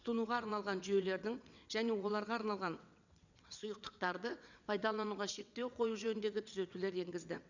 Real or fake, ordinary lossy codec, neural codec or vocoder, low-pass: fake; none; vocoder, 22.05 kHz, 80 mel bands, WaveNeXt; 7.2 kHz